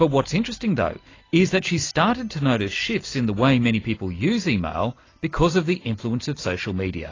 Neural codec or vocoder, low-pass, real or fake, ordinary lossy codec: none; 7.2 kHz; real; AAC, 32 kbps